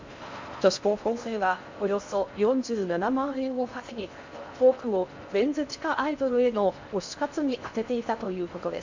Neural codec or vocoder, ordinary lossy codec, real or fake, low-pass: codec, 16 kHz in and 24 kHz out, 0.6 kbps, FocalCodec, streaming, 4096 codes; MP3, 64 kbps; fake; 7.2 kHz